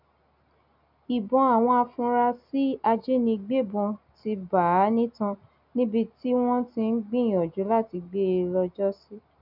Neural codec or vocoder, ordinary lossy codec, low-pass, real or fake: none; none; 5.4 kHz; real